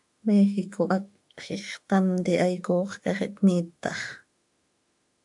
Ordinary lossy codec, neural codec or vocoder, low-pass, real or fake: AAC, 64 kbps; autoencoder, 48 kHz, 32 numbers a frame, DAC-VAE, trained on Japanese speech; 10.8 kHz; fake